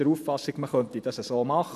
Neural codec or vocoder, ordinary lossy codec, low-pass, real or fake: none; none; 14.4 kHz; real